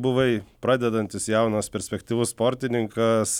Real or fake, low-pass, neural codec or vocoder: real; 19.8 kHz; none